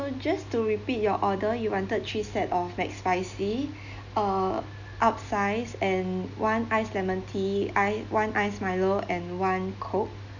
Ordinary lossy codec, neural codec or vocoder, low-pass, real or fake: none; none; 7.2 kHz; real